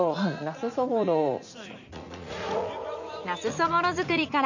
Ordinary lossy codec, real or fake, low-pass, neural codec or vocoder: none; fake; 7.2 kHz; vocoder, 44.1 kHz, 80 mel bands, Vocos